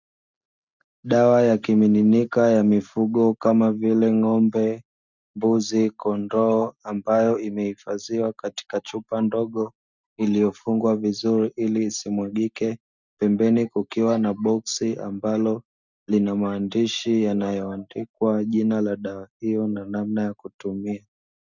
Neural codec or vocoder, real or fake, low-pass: none; real; 7.2 kHz